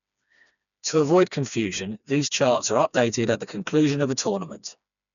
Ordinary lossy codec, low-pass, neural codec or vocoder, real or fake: none; 7.2 kHz; codec, 16 kHz, 2 kbps, FreqCodec, smaller model; fake